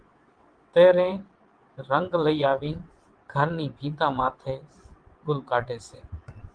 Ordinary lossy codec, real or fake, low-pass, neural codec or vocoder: Opus, 24 kbps; fake; 9.9 kHz; vocoder, 22.05 kHz, 80 mel bands, WaveNeXt